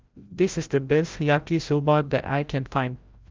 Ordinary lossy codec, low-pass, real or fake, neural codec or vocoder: Opus, 24 kbps; 7.2 kHz; fake; codec, 16 kHz, 0.5 kbps, FreqCodec, larger model